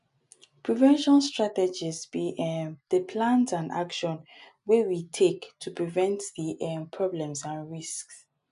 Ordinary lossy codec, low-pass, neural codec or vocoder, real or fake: none; 10.8 kHz; none; real